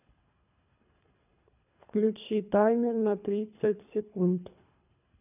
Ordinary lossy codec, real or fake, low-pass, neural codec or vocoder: none; fake; 3.6 kHz; codec, 24 kHz, 3 kbps, HILCodec